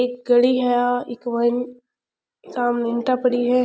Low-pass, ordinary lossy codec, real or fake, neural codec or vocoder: none; none; real; none